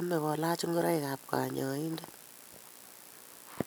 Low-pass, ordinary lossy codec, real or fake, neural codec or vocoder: none; none; real; none